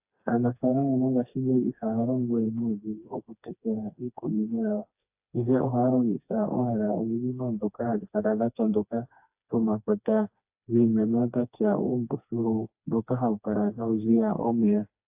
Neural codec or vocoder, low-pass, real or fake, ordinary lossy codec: codec, 16 kHz, 2 kbps, FreqCodec, smaller model; 3.6 kHz; fake; AAC, 32 kbps